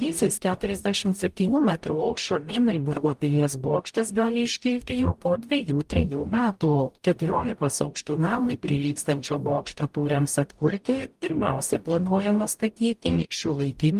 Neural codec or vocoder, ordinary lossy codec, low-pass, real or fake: codec, 44.1 kHz, 0.9 kbps, DAC; Opus, 32 kbps; 14.4 kHz; fake